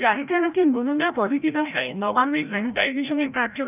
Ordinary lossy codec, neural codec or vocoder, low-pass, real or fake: none; codec, 16 kHz, 0.5 kbps, FreqCodec, larger model; 3.6 kHz; fake